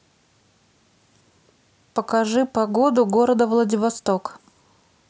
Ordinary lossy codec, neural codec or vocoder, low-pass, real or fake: none; none; none; real